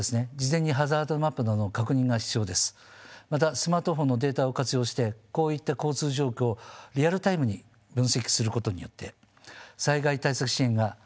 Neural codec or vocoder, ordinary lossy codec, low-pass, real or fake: none; none; none; real